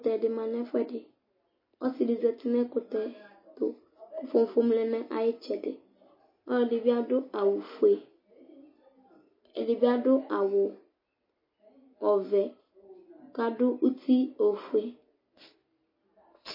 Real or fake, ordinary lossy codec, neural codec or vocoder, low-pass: real; MP3, 24 kbps; none; 5.4 kHz